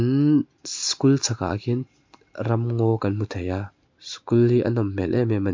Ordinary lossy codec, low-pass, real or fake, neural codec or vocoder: MP3, 48 kbps; 7.2 kHz; real; none